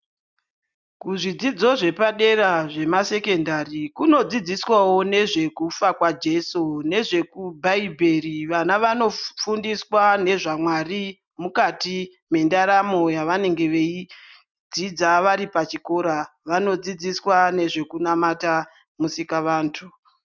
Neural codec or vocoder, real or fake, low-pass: none; real; 7.2 kHz